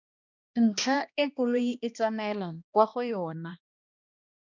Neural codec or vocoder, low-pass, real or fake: codec, 16 kHz, 1 kbps, X-Codec, HuBERT features, trained on balanced general audio; 7.2 kHz; fake